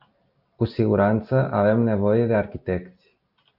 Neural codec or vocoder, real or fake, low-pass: none; real; 5.4 kHz